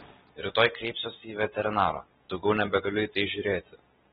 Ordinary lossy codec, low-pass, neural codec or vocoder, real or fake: AAC, 16 kbps; 19.8 kHz; none; real